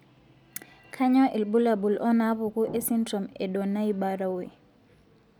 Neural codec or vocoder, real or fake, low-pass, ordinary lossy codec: none; real; 19.8 kHz; none